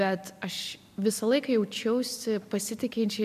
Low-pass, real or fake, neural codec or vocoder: 14.4 kHz; real; none